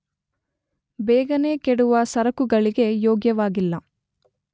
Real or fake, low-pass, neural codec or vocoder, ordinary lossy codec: real; none; none; none